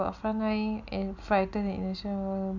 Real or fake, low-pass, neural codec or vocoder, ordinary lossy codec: real; 7.2 kHz; none; none